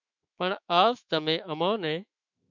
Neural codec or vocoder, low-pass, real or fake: autoencoder, 48 kHz, 128 numbers a frame, DAC-VAE, trained on Japanese speech; 7.2 kHz; fake